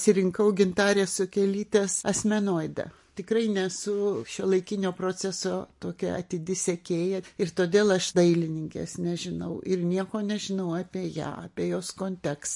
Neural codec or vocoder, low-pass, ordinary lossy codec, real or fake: none; 10.8 kHz; MP3, 48 kbps; real